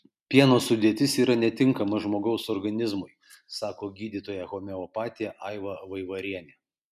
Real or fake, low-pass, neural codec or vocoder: real; 14.4 kHz; none